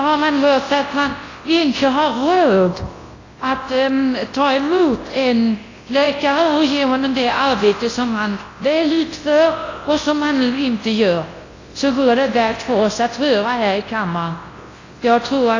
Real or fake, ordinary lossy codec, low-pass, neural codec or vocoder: fake; AAC, 32 kbps; 7.2 kHz; codec, 24 kHz, 0.9 kbps, WavTokenizer, large speech release